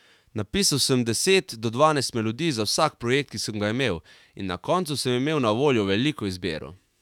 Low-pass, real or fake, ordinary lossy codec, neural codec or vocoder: 19.8 kHz; fake; none; autoencoder, 48 kHz, 128 numbers a frame, DAC-VAE, trained on Japanese speech